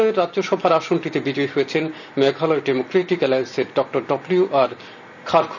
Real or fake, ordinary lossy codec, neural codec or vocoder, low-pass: real; none; none; 7.2 kHz